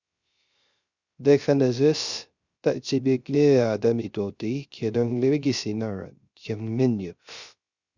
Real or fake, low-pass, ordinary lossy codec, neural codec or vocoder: fake; 7.2 kHz; Opus, 64 kbps; codec, 16 kHz, 0.3 kbps, FocalCodec